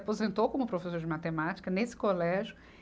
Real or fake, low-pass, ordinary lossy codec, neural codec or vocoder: real; none; none; none